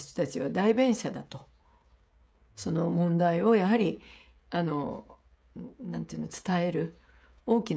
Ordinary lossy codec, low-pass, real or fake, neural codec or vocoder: none; none; fake; codec, 16 kHz, 16 kbps, FreqCodec, smaller model